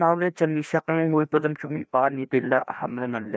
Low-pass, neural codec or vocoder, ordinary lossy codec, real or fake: none; codec, 16 kHz, 1 kbps, FreqCodec, larger model; none; fake